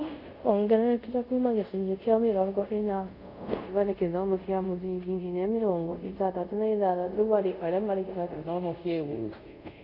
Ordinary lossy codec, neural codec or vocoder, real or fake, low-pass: none; codec, 24 kHz, 0.5 kbps, DualCodec; fake; 5.4 kHz